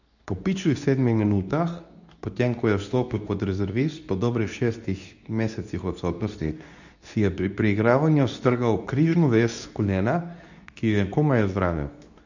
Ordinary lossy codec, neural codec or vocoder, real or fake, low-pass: none; codec, 24 kHz, 0.9 kbps, WavTokenizer, medium speech release version 2; fake; 7.2 kHz